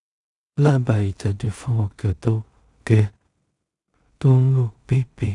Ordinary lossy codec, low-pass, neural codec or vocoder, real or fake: none; 10.8 kHz; codec, 16 kHz in and 24 kHz out, 0.4 kbps, LongCat-Audio-Codec, two codebook decoder; fake